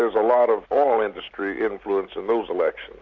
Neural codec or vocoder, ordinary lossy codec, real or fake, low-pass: none; MP3, 48 kbps; real; 7.2 kHz